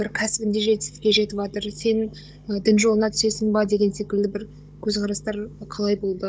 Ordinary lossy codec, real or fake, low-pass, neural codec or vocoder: none; fake; none; codec, 16 kHz, 16 kbps, FunCodec, trained on Chinese and English, 50 frames a second